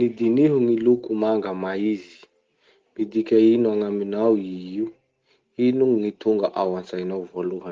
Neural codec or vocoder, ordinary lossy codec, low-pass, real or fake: none; Opus, 16 kbps; 7.2 kHz; real